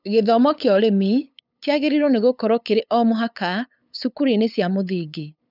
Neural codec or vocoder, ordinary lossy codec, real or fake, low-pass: codec, 16 kHz, 8 kbps, FunCodec, trained on LibriTTS, 25 frames a second; none; fake; 5.4 kHz